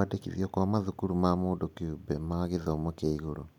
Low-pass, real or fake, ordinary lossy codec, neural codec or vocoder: 19.8 kHz; real; none; none